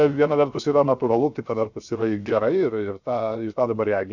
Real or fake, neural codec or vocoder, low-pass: fake; codec, 16 kHz, 0.7 kbps, FocalCodec; 7.2 kHz